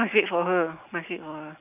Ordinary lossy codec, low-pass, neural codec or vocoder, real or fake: none; 3.6 kHz; none; real